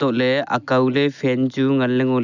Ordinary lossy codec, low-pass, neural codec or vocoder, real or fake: none; 7.2 kHz; none; real